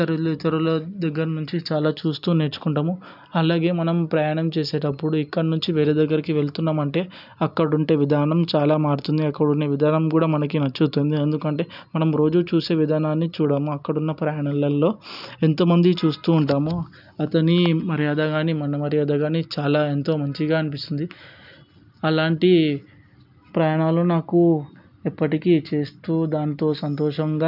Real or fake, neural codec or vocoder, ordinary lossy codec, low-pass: real; none; none; 5.4 kHz